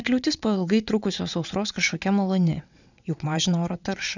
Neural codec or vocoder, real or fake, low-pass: vocoder, 22.05 kHz, 80 mel bands, WaveNeXt; fake; 7.2 kHz